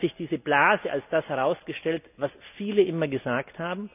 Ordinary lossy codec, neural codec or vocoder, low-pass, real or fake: none; none; 3.6 kHz; real